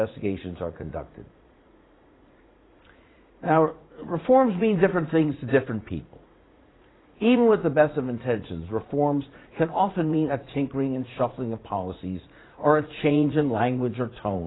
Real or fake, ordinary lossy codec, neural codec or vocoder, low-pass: fake; AAC, 16 kbps; vocoder, 44.1 kHz, 80 mel bands, Vocos; 7.2 kHz